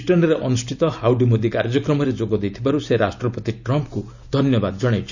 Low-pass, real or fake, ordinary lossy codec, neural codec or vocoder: 7.2 kHz; real; none; none